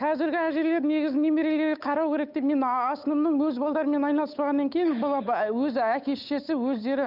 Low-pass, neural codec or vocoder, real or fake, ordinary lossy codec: 5.4 kHz; codec, 16 kHz, 8 kbps, FunCodec, trained on Chinese and English, 25 frames a second; fake; none